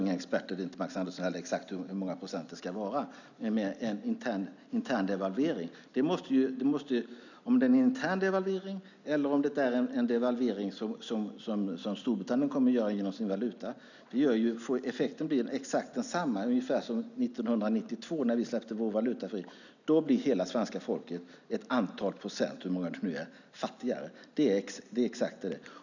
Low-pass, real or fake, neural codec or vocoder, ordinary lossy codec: 7.2 kHz; real; none; none